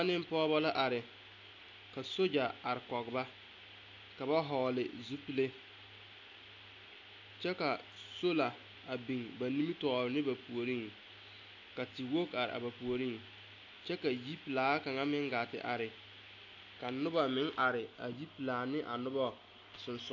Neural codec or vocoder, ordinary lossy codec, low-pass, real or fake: none; AAC, 48 kbps; 7.2 kHz; real